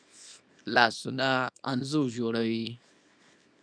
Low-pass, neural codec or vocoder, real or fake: 9.9 kHz; codec, 24 kHz, 0.9 kbps, WavTokenizer, small release; fake